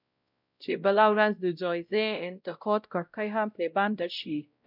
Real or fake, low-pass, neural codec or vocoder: fake; 5.4 kHz; codec, 16 kHz, 0.5 kbps, X-Codec, WavLM features, trained on Multilingual LibriSpeech